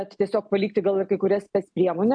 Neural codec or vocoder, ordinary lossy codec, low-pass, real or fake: none; AAC, 64 kbps; 9.9 kHz; real